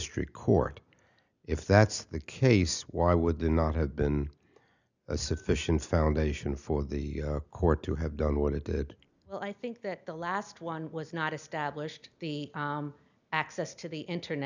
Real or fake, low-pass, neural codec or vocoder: real; 7.2 kHz; none